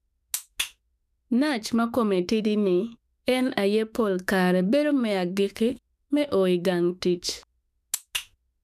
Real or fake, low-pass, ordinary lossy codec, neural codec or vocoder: fake; 14.4 kHz; none; autoencoder, 48 kHz, 32 numbers a frame, DAC-VAE, trained on Japanese speech